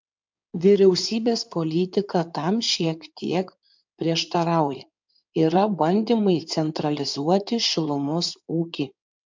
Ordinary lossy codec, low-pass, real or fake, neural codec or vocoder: MP3, 64 kbps; 7.2 kHz; fake; codec, 16 kHz in and 24 kHz out, 2.2 kbps, FireRedTTS-2 codec